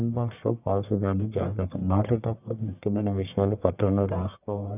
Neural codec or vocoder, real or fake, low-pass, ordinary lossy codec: codec, 44.1 kHz, 1.7 kbps, Pupu-Codec; fake; 3.6 kHz; none